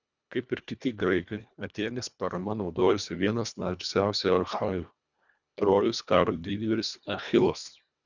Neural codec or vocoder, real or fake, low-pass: codec, 24 kHz, 1.5 kbps, HILCodec; fake; 7.2 kHz